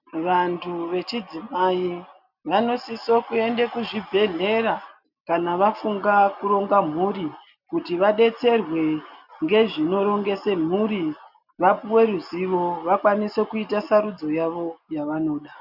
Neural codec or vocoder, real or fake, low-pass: none; real; 5.4 kHz